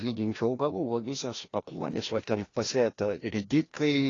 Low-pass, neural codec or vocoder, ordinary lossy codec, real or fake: 7.2 kHz; codec, 16 kHz, 1 kbps, FreqCodec, larger model; AAC, 32 kbps; fake